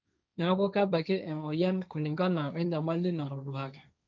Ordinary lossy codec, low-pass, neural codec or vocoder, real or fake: none; none; codec, 16 kHz, 1.1 kbps, Voila-Tokenizer; fake